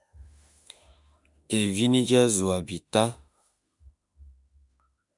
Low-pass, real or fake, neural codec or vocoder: 10.8 kHz; fake; autoencoder, 48 kHz, 32 numbers a frame, DAC-VAE, trained on Japanese speech